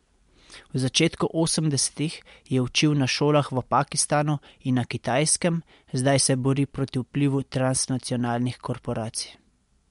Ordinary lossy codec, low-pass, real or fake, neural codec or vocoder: MP3, 64 kbps; 10.8 kHz; real; none